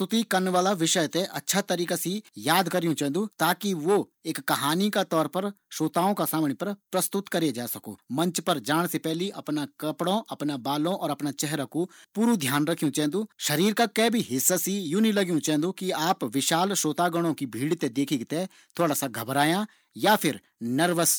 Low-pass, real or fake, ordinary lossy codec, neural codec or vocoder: none; real; none; none